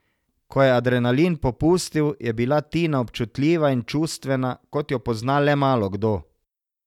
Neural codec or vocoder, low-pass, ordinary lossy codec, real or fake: none; 19.8 kHz; none; real